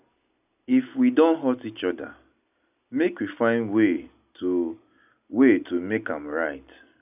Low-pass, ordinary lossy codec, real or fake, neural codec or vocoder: 3.6 kHz; none; real; none